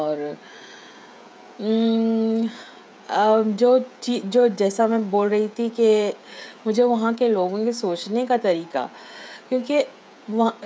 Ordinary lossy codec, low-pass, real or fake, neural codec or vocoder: none; none; fake; codec, 16 kHz, 16 kbps, FreqCodec, smaller model